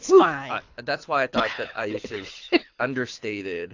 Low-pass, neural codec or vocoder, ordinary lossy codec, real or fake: 7.2 kHz; codec, 24 kHz, 6 kbps, HILCodec; AAC, 48 kbps; fake